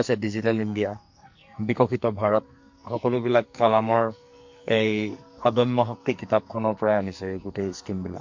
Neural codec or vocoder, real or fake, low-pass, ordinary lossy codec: codec, 44.1 kHz, 2.6 kbps, SNAC; fake; 7.2 kHz; MP3, 48 kbps